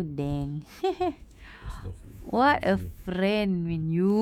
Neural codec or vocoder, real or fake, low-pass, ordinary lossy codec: autoencoder, 48 kHz, 128 numbers a frame, DAC-VAE, trained on Japanese speech; fake; 19.8 kHz; none